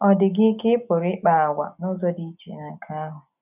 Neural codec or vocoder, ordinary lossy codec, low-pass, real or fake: none; none; 3.6 kHz; real